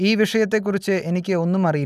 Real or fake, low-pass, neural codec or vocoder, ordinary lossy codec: real; 14.4 kHz; none; none